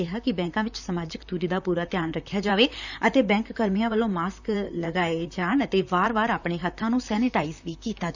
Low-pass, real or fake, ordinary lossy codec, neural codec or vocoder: 7.2 kHz; fake; none; vocoder, 44.1 kHz, 128 mel bands, Pupu-Vocoder